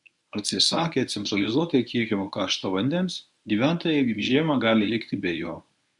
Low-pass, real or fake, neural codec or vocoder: 10.8 kHz; fake; codec, 24 kHz, 0.9 kbps, WavTokenizer, medium speech release version 1